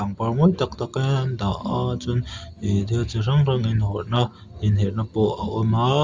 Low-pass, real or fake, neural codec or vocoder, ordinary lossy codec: 7.2 kHz; real; none; Opus, 32 kbps